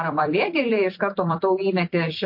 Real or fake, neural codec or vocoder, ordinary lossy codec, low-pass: fake; vocoder, 44.1 kHz, 128 mel bands every 256 samples, BigVGAN v2; MP3, 32 kbps; 5.4 kHz